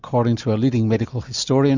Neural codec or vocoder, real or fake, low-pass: none; real; 7.2 kHz